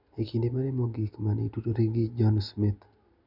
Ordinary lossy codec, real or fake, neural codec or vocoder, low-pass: none; real; none; 5.4 kHz